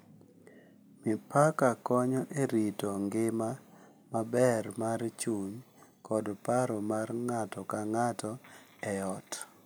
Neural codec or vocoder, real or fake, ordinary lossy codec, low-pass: none; real; none; none